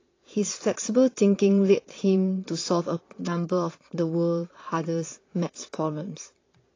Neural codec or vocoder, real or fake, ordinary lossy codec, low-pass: vocoder, 22.05 kHz, 80 mel bands, Vocos; fake; AAC, 32 kbps; 7.2 kHz